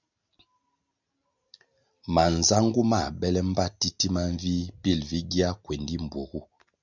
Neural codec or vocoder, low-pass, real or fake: none; 7.2 kHz; real